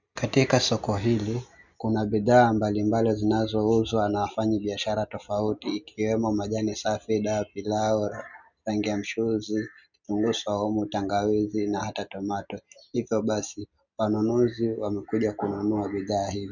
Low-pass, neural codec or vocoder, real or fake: 7.2 kHz; none; real